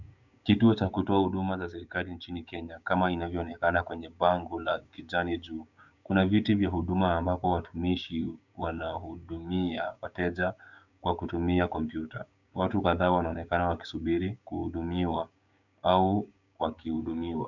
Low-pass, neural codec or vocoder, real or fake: 7.2 kHz; codec, 16 kHz, 6 kbps, DAC; fake